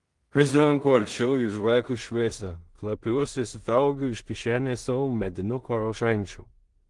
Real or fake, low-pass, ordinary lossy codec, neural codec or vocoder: fake; 10.8 kHz; Opus, 24 kbps; codec, 16 kHz in and 24 kHz out, 0.4 kbps, LongCat-Audio-Codec, two codebook decoder